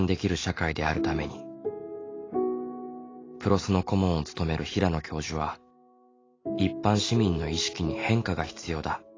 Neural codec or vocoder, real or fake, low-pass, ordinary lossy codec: none; real; 7.2 kHz; AAC, 32 kbps